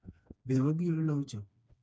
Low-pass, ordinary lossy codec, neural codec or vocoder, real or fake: none; none; codec, 16 kHz, 2 kbps, FreqCodec, smaller model; fake